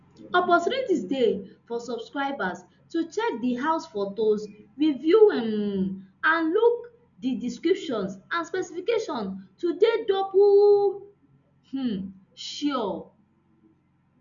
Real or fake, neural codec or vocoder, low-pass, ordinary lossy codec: real; none; 7.2 kHz; none